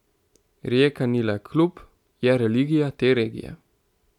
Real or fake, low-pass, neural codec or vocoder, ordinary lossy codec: real; 19.8 kHz; none; none